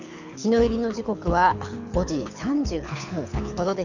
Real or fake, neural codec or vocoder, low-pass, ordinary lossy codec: fake; codec, 24 kHz, 6 kbps, HILCodec; 7.2 kHz; none